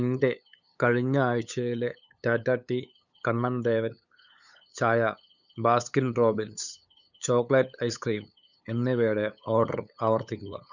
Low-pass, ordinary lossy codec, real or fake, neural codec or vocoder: 7.2 kHz; none; fake; codec, 16 kHz, 8 kbps, FunCodec, trained on LibriTTS, 25 frames a second